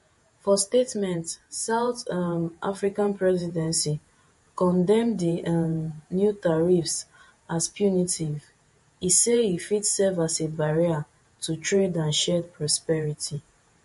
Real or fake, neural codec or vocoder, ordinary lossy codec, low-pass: fake; vocoder, 48 kHz, 128 mel bands, Vocos; MP3, 48 kbps; 14.4 kHz